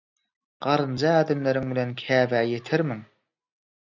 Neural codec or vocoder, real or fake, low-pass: none; real; 7.2 kHz